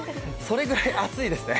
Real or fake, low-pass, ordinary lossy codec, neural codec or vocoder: real; none; none; none